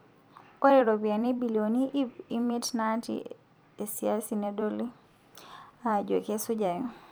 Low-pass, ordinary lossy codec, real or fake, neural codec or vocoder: none; none; fake; vocoder, 44.1 kHz, 128 mel bands every 256 samples, BigVGAN v2